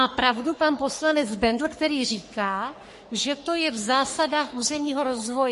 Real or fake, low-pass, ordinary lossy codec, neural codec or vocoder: fake; 14.4 kHz; MP3, 48 kbps; codec, 44.1 kHz, 3.4 kbps, Pupu-Codec